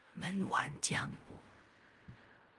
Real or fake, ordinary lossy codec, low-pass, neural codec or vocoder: fake; Opus, 32 kbps; 10.8 kHz; codec, 16 kHz in and 24 kHz out, 0.4 kbps, LongCat-Audio-Codec, fine tuned four codebook decoder